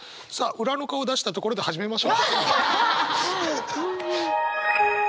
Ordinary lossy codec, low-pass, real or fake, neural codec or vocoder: none; none; real; none